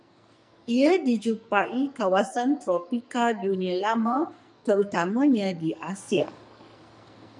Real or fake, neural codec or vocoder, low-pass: fake; codec, 44.1 kHz, 2.6 kbps, SNAC; 10.8 kHz